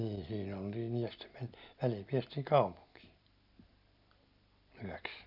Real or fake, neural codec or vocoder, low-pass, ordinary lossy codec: real; none; 5.4 kHz; none